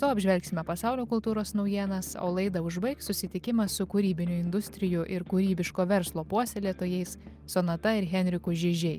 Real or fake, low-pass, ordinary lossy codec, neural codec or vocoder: real; 14.4 kHz; Opus, 32 kbps; none